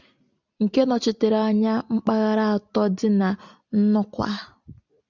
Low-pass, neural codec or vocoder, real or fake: 7.2 kHz; none; real